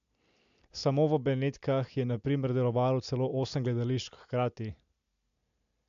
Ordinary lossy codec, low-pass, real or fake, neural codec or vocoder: AAC, 64 kbps; 7.2 kHz; real; none